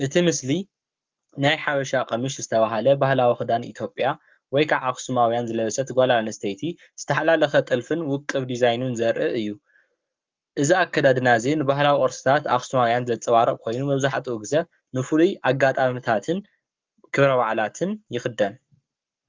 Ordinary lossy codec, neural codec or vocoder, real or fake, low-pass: Opus, 24 kbps; codec, 44.1 kHz, 7.8 kbps, Pupu-Codec; fake; 7.2 kHz